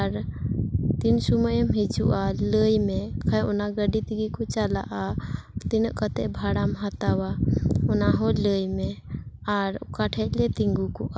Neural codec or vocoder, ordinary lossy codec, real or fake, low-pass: none; none; real; none